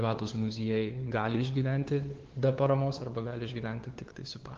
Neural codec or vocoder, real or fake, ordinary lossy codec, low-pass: codec, 16 kHz, 2 kbps, FunCodec, trained on LibriTTS, 25 frames a second; fake; Opus, 16 kbps; 7.2 kHz